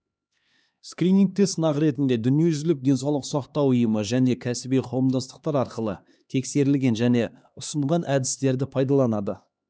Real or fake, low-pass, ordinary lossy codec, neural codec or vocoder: fake; none; none; codec, 16 kHz, 2 kbps, X-Codec, HuBERT features, trained on LibriSpeech